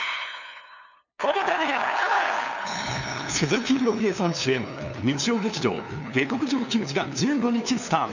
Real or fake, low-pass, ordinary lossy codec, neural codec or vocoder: fake; 7.2 kHz; none; codec, 16 kHz, 2 kbps, FunCodec, trained on LibriTTS, 25 frames a second